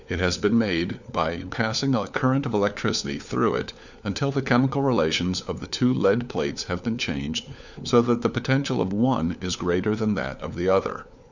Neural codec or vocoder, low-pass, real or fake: codec, 16 kHz, 4 kbps, FunCodec, trained on LibriTTS, 50 frames a second; 7.2 kHz; fake